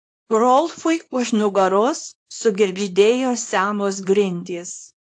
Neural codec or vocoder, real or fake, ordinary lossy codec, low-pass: codec, 24 kHz, 0.9 kbps, WavTokenizer, small release; fake; AAC, 48 kbps; 9.9 kHz